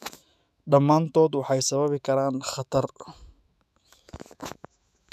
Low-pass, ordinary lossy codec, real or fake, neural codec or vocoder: 14.4 kHz; none; fake; autoencoder, 48 kHz, 128 numbers a frame, DAC-VAE, trained on Japanese speech